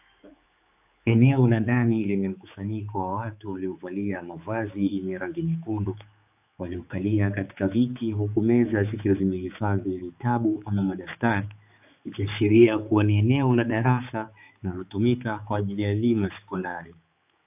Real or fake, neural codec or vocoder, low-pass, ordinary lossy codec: fake; codec, 16 kHz, 4 kbps, X-Codec, HuBERT features, trained on general audio; 3.6 kHz; AAC, 32 kbps